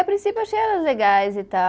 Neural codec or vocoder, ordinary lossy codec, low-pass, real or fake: none; none; none; real